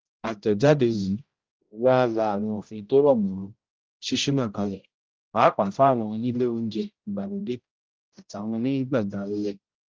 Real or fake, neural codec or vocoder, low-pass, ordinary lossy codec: fake; codec, 16 kHz, 0.5 kbps, X-Codec, HuBERT features, trained on general audio; 7.2 kHz; Opus, 32 kbps